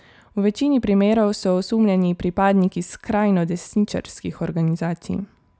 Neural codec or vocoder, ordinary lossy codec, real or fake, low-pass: none; none; real; none